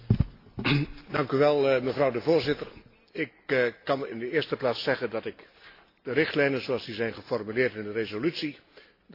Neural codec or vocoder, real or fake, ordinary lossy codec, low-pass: none; real; AAC, 32 kbps; 5.4 kHz